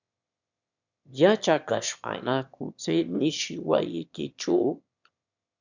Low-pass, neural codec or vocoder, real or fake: 7.2 kHz; autoencoder, 22.05 kHz, a latent of 192 numbers a frame, VITS, trained on one speaker; fake